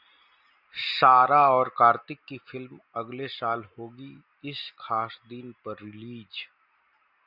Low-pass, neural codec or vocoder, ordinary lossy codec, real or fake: 5.4 kHz; none; Opus, 64 kbps; real